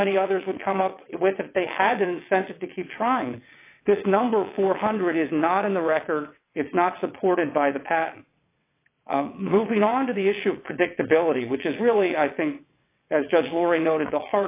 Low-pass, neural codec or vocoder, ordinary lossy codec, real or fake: 3.6 kHz; vocoder, 22.05 kHz, 80 mel bands, WaveNeXt; AAC, 24 kbps; fake